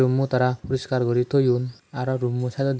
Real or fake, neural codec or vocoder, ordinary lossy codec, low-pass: real; none; none; none